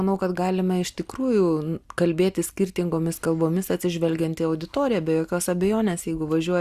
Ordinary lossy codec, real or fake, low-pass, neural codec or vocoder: Opus, 64 kbps; real; 14.4 kHz; none